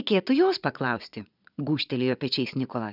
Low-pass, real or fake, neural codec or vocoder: 5.4 kHz; fake; vocoder, 22.05 kHz, 80 mel bands, Vocos